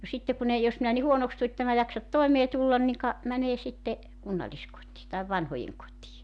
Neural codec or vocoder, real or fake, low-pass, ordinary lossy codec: none; real; none; none